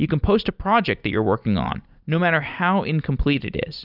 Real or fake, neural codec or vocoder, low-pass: real; none; 5.4 kHz